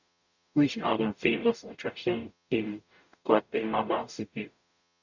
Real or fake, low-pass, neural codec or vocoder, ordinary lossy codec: fake; 7.2 kHz; codec, 44.1 kHz, 0.9 kbps, DAC; none